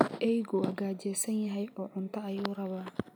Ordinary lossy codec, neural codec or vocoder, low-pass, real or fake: none; none; none; real